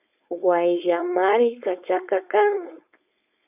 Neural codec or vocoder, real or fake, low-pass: codec, 16 kHz, 4.8 kbps, FACodec; fake; 3.6 kHz